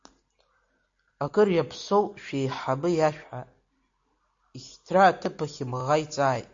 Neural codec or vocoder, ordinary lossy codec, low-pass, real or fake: none; AAC, 48 kbps; 7.2 kHz; real